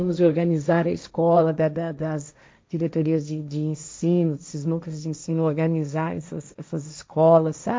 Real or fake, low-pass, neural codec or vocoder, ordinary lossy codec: fake; none; codec, 16 kHz, 1.1 kbps, Voila-Tokenizer; none